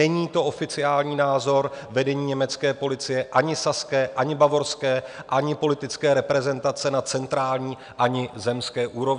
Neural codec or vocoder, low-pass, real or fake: none; 9.9 kHz; real